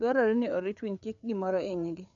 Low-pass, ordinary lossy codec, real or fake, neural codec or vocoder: 7.2 kHz; none; fake; codec, 16 kHz, 4 kbps, FreqCodec, larger model